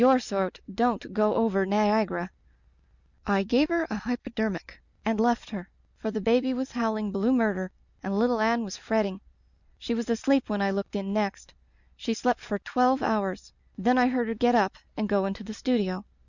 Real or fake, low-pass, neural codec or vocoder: real; 7.2 kHz; none